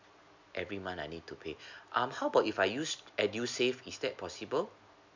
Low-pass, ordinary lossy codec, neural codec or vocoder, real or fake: 7.2 kHz; MP3, 64 kbps; none; real